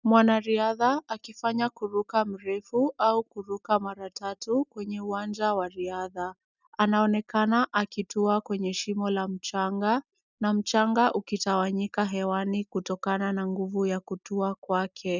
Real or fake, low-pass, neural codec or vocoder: real; 7.2 kHz; none